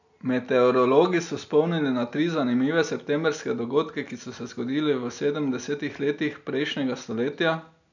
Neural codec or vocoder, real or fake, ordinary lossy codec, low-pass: none; real; none; 7.2 kHz